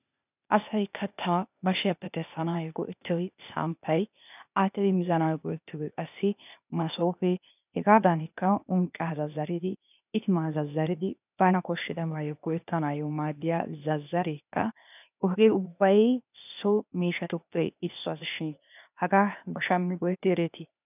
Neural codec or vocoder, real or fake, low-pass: codec, 16 kHz, 0.8 kbps, ZipCodec; fake; 3.6 kHz